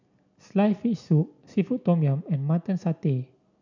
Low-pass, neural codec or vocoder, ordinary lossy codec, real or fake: 7.2 kHz; none; none; real